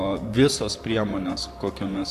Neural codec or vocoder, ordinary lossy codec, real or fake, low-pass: vocoder, 44.1 kHz, 128 mel bands, Pupu-Vocoder; AAC, 96 kbps; fake; 14.4 kHz